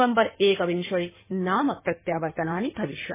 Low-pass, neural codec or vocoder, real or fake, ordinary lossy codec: 3.6 kHz; codec, 16 kHz in and 24 kHz out, 2.2 kbps, FireRedTTS-2 codec; fake; MP3, 16 kbps